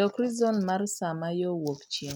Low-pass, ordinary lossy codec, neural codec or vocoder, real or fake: none; none; none; real